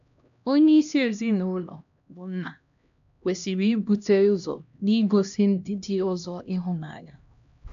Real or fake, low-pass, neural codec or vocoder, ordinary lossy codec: fake; 7.2 kHz; codec, 16 kHz, 1 kbps, X-Codec, HuBERT features, trained on LibriSpeech; none